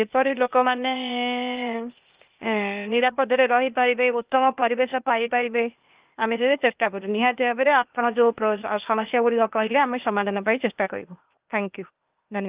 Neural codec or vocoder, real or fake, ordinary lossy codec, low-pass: codec, 16 kHz, 0.8 kbps, ZipCodec; fake; Opus, 24 kbps; 3.6 kHz